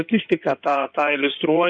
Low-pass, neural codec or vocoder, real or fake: 9.9 kHz; codec, 16 kHz in and 24 kHz out, 2.2 kbps, FireRedTTS-2 codec; fake